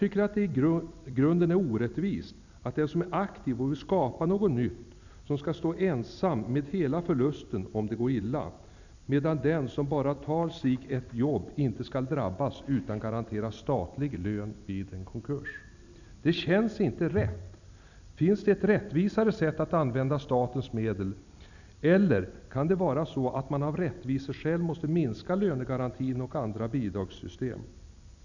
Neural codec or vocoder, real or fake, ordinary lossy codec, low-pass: vocoder, 44.1 kHz, 128 mel bands every 256 samples, BigVGAN v2; fake; none; 7.2 kHz